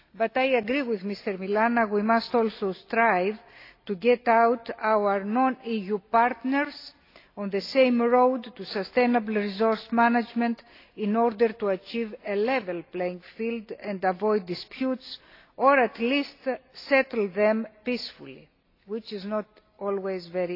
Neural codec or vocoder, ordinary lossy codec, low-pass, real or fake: none; AAC, 32 kbps; 5.4 kHz; real